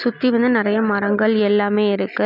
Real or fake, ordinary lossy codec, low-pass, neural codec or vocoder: real; none; 5.4 kHz; none